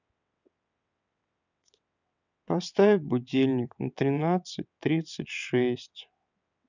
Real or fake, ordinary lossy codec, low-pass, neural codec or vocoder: fake; none; 7.2 kHz; vocoder, 22.05 kHz, 80 mel bands, WaveNeXt